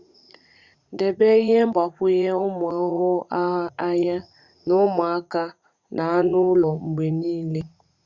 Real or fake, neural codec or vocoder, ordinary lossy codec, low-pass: fake; vocoder, 44.1 kHz, 80 mel bands, Vocos; Opus, 64 kbps; 7.2 kHz